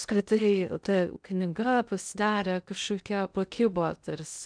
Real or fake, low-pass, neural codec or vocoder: fake; 9.9 kHz; codec, 16 kHz in and 24 kHz out, 0.6 kbps, FocalCodec, streaming, 2048 codes